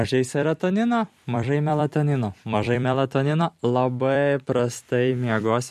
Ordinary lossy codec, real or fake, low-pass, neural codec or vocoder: MP3, 64 kbps; fake; 14.4 kHz; vocoder, 44.1 kHz, 128 mel bands every 256 samples, BigVGAN v2